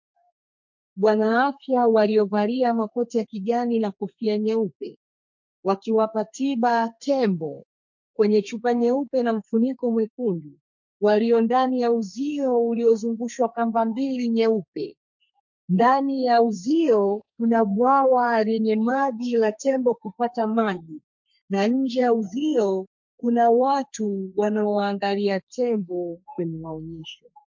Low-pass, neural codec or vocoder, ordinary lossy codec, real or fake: 7.2 kHz; codec, 32 kHz, 1.9 kbps, SNAC; MP3, 48 kbps; fake